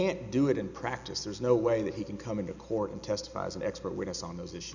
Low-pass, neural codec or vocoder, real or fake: 7.2 kHz; none; real